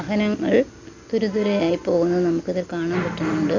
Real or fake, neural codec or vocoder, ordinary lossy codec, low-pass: real; none; MP3, 48 kbps; 7.2 kHz